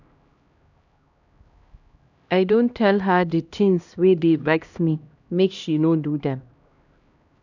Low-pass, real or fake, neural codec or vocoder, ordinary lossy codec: 7.2 kHz; fake; codec, 16 kHz, 1 kbps, X-Codec, HuBERT features, trained on LibriSpeech; none